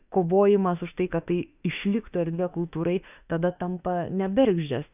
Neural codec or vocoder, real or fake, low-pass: autoencoder, 48 kHz, 32 numbers a frame, DAC-VAE, trained on Japanese speech; fake; 3.6 kHz